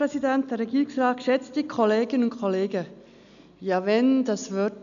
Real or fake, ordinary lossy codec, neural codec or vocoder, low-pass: real; none; none; 7.2 kHz